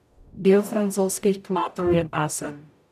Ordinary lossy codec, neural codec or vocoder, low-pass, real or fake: none; codec, 44.1 kHz, 0.9 kbps, DAC; 14.4 kHz; fake